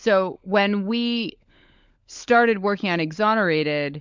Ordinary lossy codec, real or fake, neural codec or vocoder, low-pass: MP3, 64 kbps; fake; codec, 16 kHz, 16 kbps, FunCodec, trained on LibriTTS, 50 frames a second; 7.2 kHz